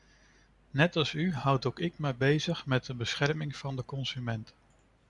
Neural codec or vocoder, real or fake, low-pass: none; real; 10.8 kHz